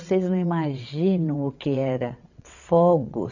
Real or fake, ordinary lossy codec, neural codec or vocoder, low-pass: fake; none; vocoder, 22.05 kHz, 80 mel bands, WaveNeXt; 7.2 kHz